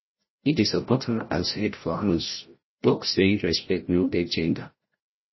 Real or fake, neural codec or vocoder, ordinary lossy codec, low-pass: fake; codec, 16 kHz, 0.5 kbps, FreqCodec, larger model; MP3, 24 kbps; 7.2 kHz